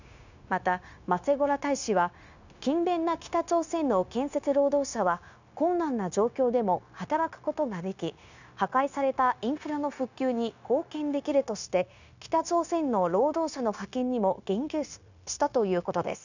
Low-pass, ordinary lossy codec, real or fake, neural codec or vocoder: 7.2 kHz; none; fake; codec, 16 kHz, 0.9 kbps, LongCat-Audio-Codec